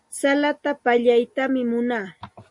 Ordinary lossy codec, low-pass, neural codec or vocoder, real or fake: MP3, 48 kbps; 10.8 kHz; none; real